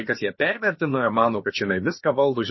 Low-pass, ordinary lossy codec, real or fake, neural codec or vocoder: 7.2 kHz; MP3, 24 kbps; fake; codec, 16 kHz, about 1 kbps, DyCAST, with the encoder's durations